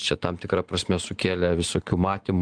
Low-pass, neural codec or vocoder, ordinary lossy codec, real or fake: 9.9 kHz; none; Opus, 24 kbps; real